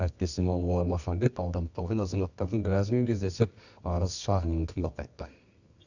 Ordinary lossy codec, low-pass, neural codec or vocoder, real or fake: none; 7.2 kHz; codec, 24 kHz, 0.9 kbps, WavTokenizer, medium music audio release; fake